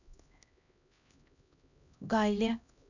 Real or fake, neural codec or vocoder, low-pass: fake; codec, 16 kHz, 1 kbps, X-Codec, HuBERT features, trained on LibriSpeech; 7.2 kHz